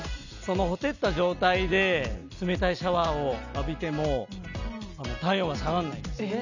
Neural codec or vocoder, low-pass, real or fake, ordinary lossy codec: none; 7.2 kHz; real; none